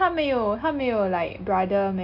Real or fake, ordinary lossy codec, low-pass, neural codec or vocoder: real; none; 5.4 kHz; none